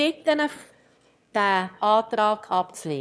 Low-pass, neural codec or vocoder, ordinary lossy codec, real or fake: none; autoencoder, 22.05 kHz, a latent of 192 numbers a frame, VITS, trained on one speaker; none; fake